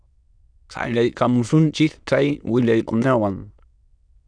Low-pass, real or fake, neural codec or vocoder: 9.9 kHz; fake; autoencoder, 22.05 kHz, a latent of 192 numbers a frame, VITS, trained on many speakers